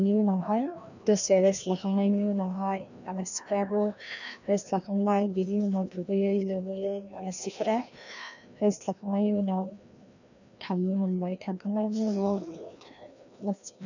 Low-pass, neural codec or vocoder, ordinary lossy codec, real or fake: 7.2 kHz; codec, 16 kHz, 1 kbps, FreqCodec, larger model; none; fake